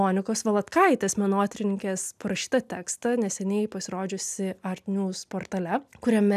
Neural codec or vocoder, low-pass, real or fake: none; 14.4 kHz; real